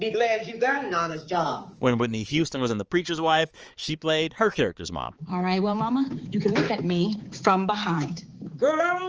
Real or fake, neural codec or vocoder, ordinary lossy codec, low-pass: fake; codec, 16 kHz, 4 kbps, X-Codec, HuBERT features, trained on balanced general audio; Opus, 24 kbps; 7.2 kHz